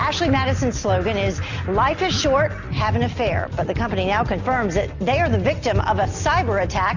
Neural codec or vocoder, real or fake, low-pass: none; real; 7.2 kHz